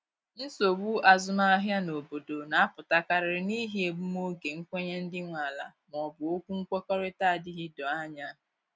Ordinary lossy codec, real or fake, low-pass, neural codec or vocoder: none; real; none; none